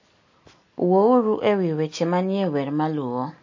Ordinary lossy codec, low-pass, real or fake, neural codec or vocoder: MP3, 32 kbps; 7.2 kHz; real; none